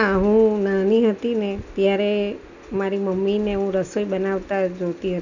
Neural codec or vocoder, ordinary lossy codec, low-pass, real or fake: none; none; 7.2 kHz; real